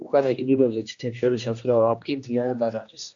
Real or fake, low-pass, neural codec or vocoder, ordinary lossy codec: fake; 7.2 kHz; codec, 16 kHz, 1 kbps, X-Codec, HuBERT features, trained on general audio; AAC, 64 kbps